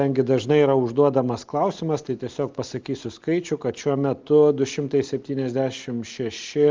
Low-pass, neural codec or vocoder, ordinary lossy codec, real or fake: 7.2 kHz; none; Opus, 32 kbps; real